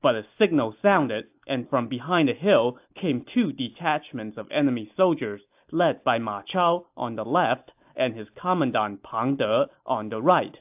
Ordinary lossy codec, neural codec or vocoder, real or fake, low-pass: AAC, 32 kbps; none; real; 3.6 kHz